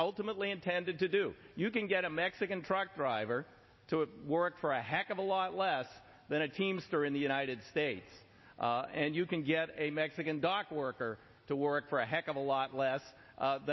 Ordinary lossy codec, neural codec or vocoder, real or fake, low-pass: MP3, 24 kbps; none; real; 7.2 kHz